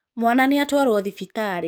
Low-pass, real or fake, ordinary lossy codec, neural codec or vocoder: none; fake; none; codec, 44.1 kHz, 7.8 kbps, DAC